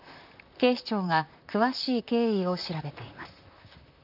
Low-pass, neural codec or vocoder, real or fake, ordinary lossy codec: 5.4 kHz; vocoder, 44.1 kHz, 128 mel bands, Pupu-Vocoder; fake; none